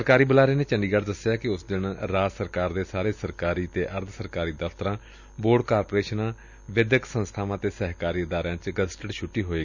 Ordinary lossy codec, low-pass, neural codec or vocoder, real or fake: none; 7.2 kHz; none; real